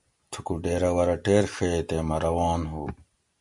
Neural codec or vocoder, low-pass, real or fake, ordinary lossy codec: none; 10.8 kHz; real; MP3, 64 kbps